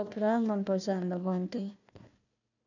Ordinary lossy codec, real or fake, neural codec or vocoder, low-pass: none; fake; codec, 16 kHz, 1 kbps, FunCodec, trained on Chinese and English, 50 frames a second; 7.2 kHz